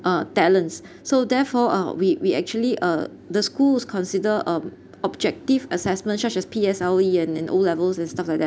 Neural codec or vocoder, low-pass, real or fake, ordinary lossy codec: none; none; real; none